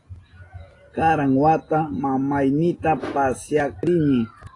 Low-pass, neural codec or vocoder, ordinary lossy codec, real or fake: 10.8 kHz; none; AAC, 32 kbps; real